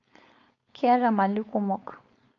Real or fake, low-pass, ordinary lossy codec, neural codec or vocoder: fake; 7.2 kHz; AAC, 48 kbps; codec, 16 kHz, 4.8 kbps, FACodec